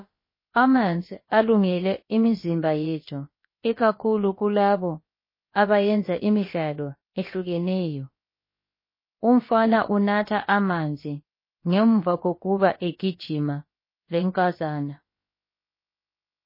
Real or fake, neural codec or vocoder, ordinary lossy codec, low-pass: fake; codec, 16 kHz, about 1 kbps, DyCAST, with the encoder's durations; MP3, 24 kbps; 5.4 kHz